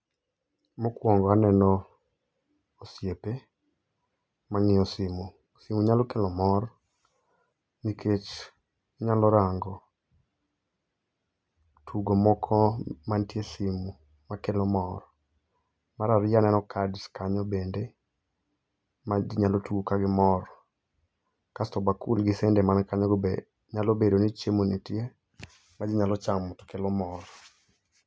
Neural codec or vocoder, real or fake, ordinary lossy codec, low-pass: none; real; none; none